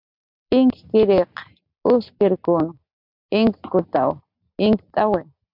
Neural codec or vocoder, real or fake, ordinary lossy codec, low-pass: none; real; MP3, 48 kbps; 5.4 kHz